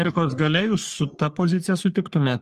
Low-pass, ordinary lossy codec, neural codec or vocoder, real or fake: 14.4 kHz; Opus, 32 kbps; codec, 44.1 kHz, 3.4 kbps, Pupu-Codec; fake